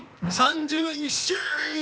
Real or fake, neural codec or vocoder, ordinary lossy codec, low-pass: fake; codec, 16 kHz, 0.8 kbps, ZipCodec; none; none